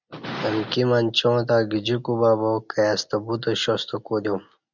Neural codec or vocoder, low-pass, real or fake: none; 7.2 kHz; real